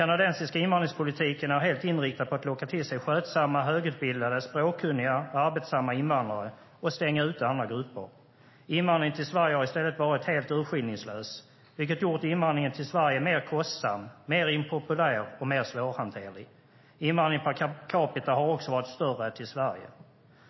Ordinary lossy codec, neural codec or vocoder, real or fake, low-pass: MP3, 24 kbps; none; real; 7.2 kHz